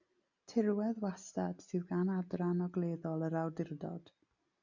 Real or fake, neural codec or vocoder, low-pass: real; none; 7.2 kHz